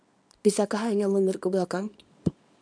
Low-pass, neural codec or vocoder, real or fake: 9.9 kHz; codec, 24 kHz, 0.9 kbps, WavTokenizer, small release; fake